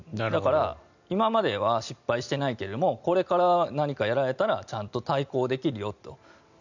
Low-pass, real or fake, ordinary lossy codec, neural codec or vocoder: 7.2 kHz; real; none; none